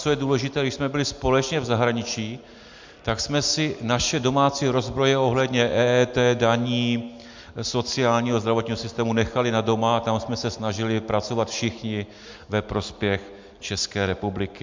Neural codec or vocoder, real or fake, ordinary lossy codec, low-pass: none; real; MP3, 64 kbps; 7.2 kHz